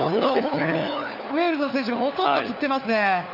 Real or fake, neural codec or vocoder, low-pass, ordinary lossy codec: fake; codec, 16 kHz, 4 kbps, FunCodec, trained on LibriTTS, 50 frames a second; 5.4 kHz; none